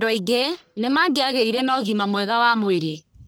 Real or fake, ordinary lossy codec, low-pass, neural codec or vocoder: fake; none; none; codec, 44.1 kHz, 1.7 kbps, Pupu-Codec